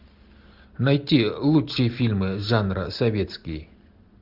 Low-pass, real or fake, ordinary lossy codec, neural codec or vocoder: 5.4 kHz; real; Opus, 64 kbps; none